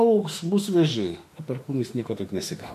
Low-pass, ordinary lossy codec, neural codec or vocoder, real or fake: 14.4 kHz; MP3, 64 kbps; autoencoder, 48 kHz, 32 numbers a frame, DAC-VAE, trained on Japanese speech; fake